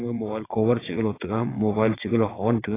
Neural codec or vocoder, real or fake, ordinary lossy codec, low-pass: vocoder, 22.05 kHz, 80 mel bands, WaveNeXt; fake; AAC, 16 kbps; 3.6 kHz